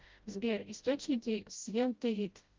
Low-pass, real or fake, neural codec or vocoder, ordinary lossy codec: 7.2 kHz; fake; codec, 16 kHz, 0.5 kbps, FreqCodec, smaller model; Opus, 32 kbps